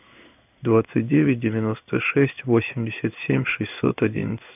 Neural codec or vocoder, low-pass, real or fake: codec, 16 kHz in and 24 kHz out, 1 kbps, XY-Tokenizer; 3.6 kHz; fake